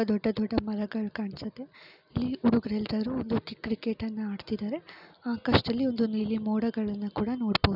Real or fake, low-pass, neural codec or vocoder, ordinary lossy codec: real; 5.4 kHz; none; none